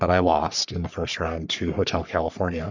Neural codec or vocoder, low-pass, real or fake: codec, 44.1 kHz, 3.4 kbps, Pupu-Codec; 7.2 kHz; fake